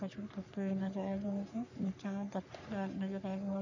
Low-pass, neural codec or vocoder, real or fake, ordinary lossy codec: 7.2 kHz; codec, 44.1 kHz, 3.4 kbps, Pupu-Codec; fake; none